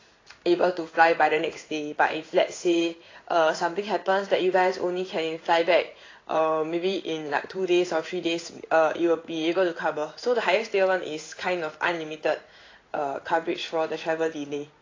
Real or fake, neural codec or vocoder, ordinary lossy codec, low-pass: fake; codec, 16 kHz in and 24 kHz out, 1 kbps, XY-Tokenizer; AAC, 32 kbps; 7.2 kHz